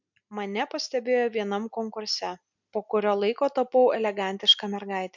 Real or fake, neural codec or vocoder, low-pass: real; none; 7.2 kHz